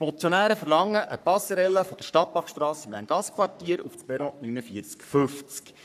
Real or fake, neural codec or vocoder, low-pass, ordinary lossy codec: fake; codec, 44.1 kHz, 3.4 kbps, Pupu-Codec; 14.4 kHz; none